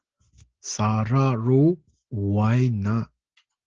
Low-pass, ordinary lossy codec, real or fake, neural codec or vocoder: 7.2 kHz; Opus, 16 kbps; real; none